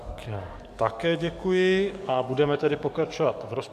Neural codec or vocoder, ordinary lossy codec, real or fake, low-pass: codec, 44.1 kHz, 7.8 kbps, DAC; AAC, 96 kbps; fake; 14.4 kHz